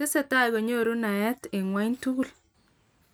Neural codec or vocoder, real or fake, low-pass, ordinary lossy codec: none; real; none; none